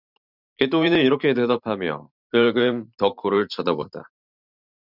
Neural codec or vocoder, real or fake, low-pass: vocoder, 44.1 kHz, 128 mel bands every 512 samples, BigVGAN v2; fake; 5.4 kHz